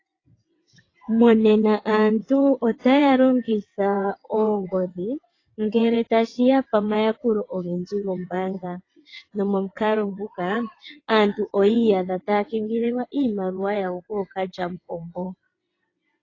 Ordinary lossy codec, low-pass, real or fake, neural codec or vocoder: AAC, 32 kbps; 7.2 kHz; fake; vocoder, 22.05 kHz, 80 mel bands, WaveNeXt